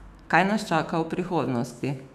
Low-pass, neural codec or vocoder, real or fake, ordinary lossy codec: 14.4 kHz; autoencoder, 48 kHz, 128 numbers a frame, DAC-VAE, trained on Japanese speech; fake; none